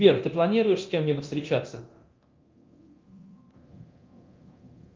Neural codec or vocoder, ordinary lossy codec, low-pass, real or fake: codec, 24 kHz, 0.9 kbps, DualCodec; Opus, 32 kbps; 7.2 kHz; fake